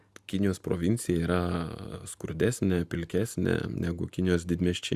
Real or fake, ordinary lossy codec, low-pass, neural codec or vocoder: real; Opus, 64 kbps; 14.4 kHz; none